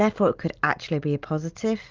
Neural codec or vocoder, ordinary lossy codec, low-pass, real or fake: none; Opus, 32 kbps; 7.2 kHz; real